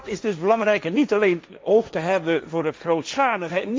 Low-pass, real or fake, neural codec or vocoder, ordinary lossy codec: none; fake; codec, 16 kHz, 1.1 kbps, Voila-Tokenizer; none